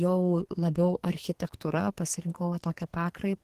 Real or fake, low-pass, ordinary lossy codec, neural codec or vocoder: fake; 14.4 kHz; Opus, 16 kbps; codec, 44.1 kHz, 2.6 kbps, SNAC